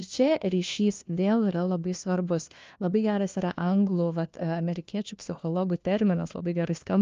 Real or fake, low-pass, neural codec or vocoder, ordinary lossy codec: fake; 7.2 kHz; codec, 16 kHz, 1 kbps, FunCodec, trained on LibriTTS, 50 frames a second; Opus, 32 kbps